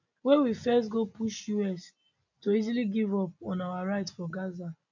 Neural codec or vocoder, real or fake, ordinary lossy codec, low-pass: none; real; none; 7.2 kHz